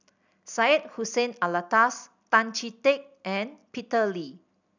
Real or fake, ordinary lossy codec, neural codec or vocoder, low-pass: real; none; none; 7.2 kHz